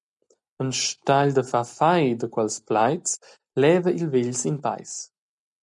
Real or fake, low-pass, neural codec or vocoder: real; 10.8 kHz; none